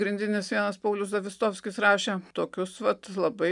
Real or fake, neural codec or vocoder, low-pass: real; none; 10.8 kHz